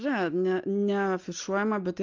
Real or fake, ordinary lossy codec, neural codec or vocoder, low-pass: real; Opus, 32 kbps; none; 7.2 kHz